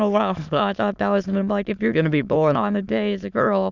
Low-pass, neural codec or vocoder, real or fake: 7.2 kHz; autoencoder, 22.05 kHz, a latent of 192 numbers a frame, VITS, trained on many speakers; fake